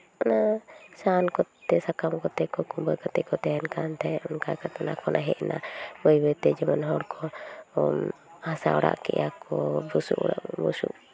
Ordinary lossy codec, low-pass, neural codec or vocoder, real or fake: none; none; none; real